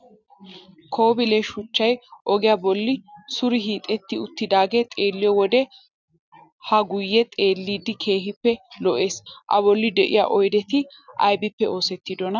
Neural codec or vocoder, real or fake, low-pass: none; real; 7.2 kHz